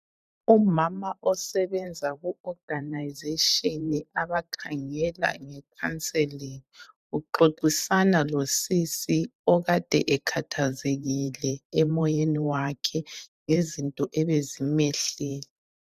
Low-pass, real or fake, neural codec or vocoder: 14.4 kHz; fake; vocoder, 44.1 kHz, 128 mel bands every 512 samples, BigVGAN v2